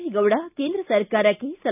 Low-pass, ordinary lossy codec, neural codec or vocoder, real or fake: 3.6 kHz; none; none; real